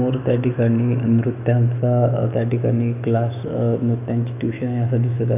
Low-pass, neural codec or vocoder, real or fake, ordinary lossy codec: 3.6 kHz; autoencoder, 48 kHz, 128 numbers a frame, DAC-VAE, trained on Japanese speech; fake; none